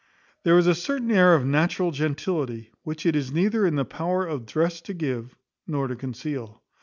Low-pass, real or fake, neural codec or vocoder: 7.2 kHz; real; none